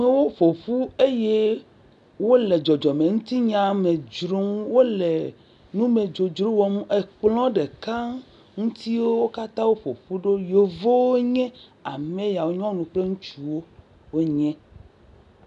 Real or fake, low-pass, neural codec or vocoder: real; 10.8 kHz; none